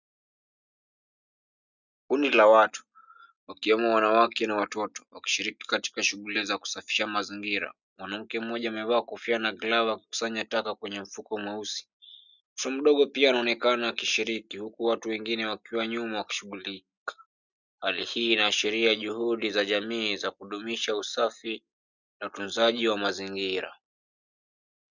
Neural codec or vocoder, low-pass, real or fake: none; 7.2 kHz; real